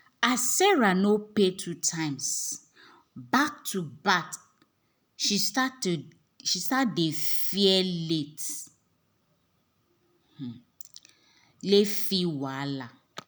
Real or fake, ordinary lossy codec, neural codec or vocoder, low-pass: real; none; none; none